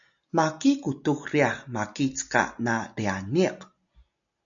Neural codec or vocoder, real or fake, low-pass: none; real; 7.2 kHz